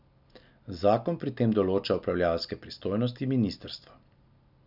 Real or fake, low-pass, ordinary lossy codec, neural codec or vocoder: real; 5.4 kHz; none; none